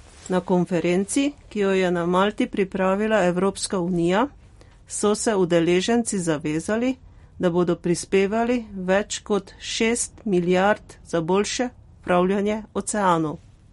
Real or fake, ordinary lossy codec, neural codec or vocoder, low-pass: real; MP3, 48 kbps; none; 19.8 kHz